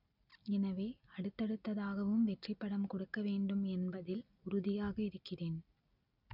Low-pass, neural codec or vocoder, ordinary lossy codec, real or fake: 5.4 kHz; none; none; real